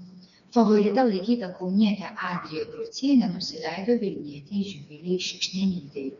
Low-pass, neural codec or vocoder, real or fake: 7.2 kHz; codec, 16 kHz, 2 kbps, FreqCodec, smaller model; fake